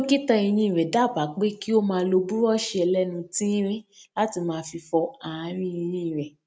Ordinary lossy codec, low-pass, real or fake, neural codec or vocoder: none; none; real; none